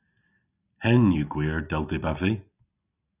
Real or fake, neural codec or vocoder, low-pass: real; none; 3.6 kHz